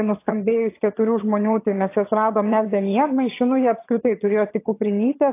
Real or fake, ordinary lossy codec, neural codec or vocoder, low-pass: fake; AAC, 24 kbps; vocoder, 22.05 kHz, 80 mel bands, HiFi-GAN; 3.6 kHz